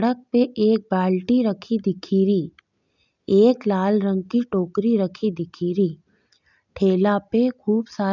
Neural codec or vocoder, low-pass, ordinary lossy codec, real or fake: none; 7.2 kHz; none; real